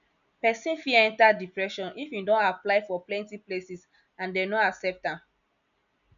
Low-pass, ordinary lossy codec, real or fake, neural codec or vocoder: 7.2 kHz; none; real; none